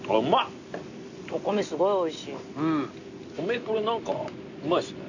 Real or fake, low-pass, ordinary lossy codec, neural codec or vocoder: real; 7.2 kHz; none; none